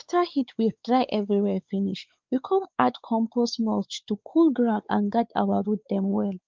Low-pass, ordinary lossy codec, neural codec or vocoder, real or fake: 7.2 kHz; Opus, 24 kbps; codec, 16 kHz, 4 kbps, X-Codec, WavLM features, trained on Multilingual LibriSpeech; fake